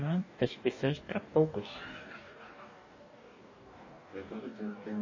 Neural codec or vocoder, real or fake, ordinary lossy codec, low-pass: codec, 44.1 kHz, 2.6 kbps, DAC; fake; MP3, 32 kbps; 7.2 kHz